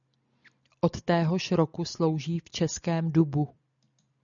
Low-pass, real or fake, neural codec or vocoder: 7.2 kHz; real; none